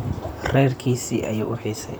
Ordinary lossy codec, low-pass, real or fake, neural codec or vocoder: none; none; fake; vocoder, 44.1 kHz, 128 mel bands, Pupu-Vocoder